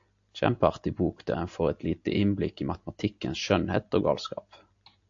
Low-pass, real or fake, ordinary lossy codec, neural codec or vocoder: 7.2 kHz; real; AAC, 64 kbps; none